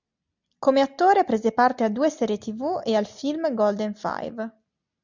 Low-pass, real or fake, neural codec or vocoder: 7.2 kHz; real; none